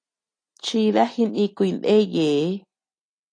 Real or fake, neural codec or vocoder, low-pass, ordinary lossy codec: real; none; 9.9 kHz; AAC, 48 kbps